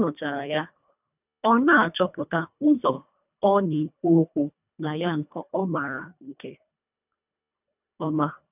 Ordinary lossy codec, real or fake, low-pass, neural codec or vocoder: none; fake; 3.6 kHz; codec, 24 kHz, 1.5 kbps, HILCodec